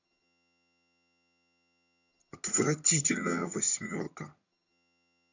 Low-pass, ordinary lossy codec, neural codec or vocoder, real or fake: 7.2 kHz; none; vocoder, 22.05 kHz, 80 mel bands, HiFi-GAN; fake